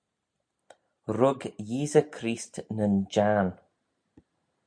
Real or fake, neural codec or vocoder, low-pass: real; none; 9.9 kHz